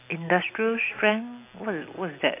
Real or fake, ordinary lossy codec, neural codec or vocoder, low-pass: real; AAC, 24 kbps; none; 3.6 kHz